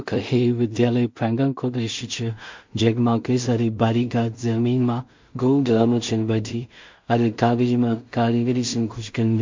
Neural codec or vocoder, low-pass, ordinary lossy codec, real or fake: codec, 16 kHz in and 24 kHz out, 0.4 kbps, LongCat-Audio-Codec, two codebook decoder; 7.2 kHz; MP3, 48 kbps; fake